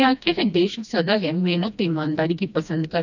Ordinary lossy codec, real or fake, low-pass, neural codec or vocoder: none; fake; 7.2 kHz; codec, 16 kHz, 1 kbps, FreqCodec, smaller model